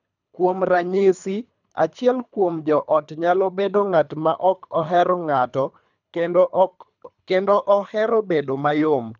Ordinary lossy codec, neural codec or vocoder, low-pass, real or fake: none; codec, 24 kHz, 3 kbps, HILCodec; 7.2 kHz; fake